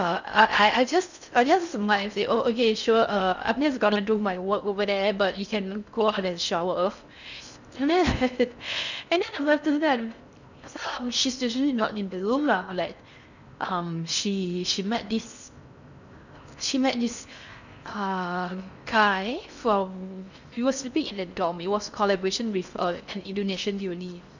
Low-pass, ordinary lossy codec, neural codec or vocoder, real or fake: 7.2 kHz; none; codec, 16 kHz in and 24 kHz out, 0.6 kbps, FocalCodec, streaming, 4096 codes; fake